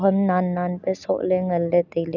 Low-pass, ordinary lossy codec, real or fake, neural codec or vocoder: 7.2 kHz; none; real; none